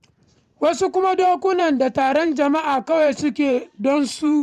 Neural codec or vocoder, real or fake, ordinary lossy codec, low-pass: vocoder, 44.1 kHz, 128 mel bands every 512 samples, BigVGAN v2; fake; none; 14.4 kHz